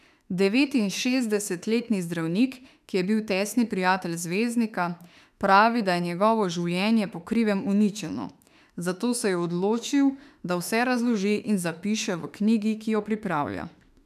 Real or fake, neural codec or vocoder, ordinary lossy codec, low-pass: fake; autoencoder, 48 kHz, 32 numbers a frame, DAC-VAE, trained on Japanese speech; none; 14.4 kHz